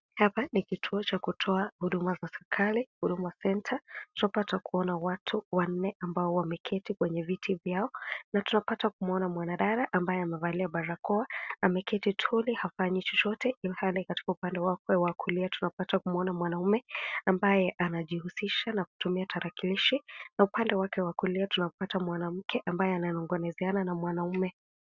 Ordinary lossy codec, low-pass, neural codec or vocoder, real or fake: Opus, 64 kbps; 7.2 kHz; none; real